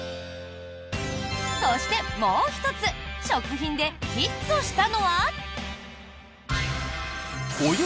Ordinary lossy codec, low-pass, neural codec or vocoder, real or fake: none; none; none; real